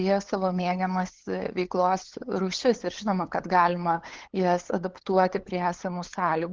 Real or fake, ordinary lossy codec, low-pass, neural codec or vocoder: fake; Opus, 16 kbps; 7.2 kHz; codec, 16 kHz, 8 kbps, FunCodec, trained on LibriTTS, 25 frames a second